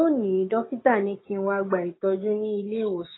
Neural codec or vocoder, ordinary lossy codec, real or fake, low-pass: codec, 16 kHz, 6 kbps, DAC; AAC, 16 kbps; fake; 7.2 kHz